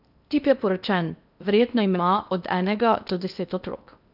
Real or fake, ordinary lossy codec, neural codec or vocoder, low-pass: fake; none; codec, 16 kHz in and 24 kHz out, 0.8 kbps, FocalCodec, streaming, 65536 codes; 5.4 kHz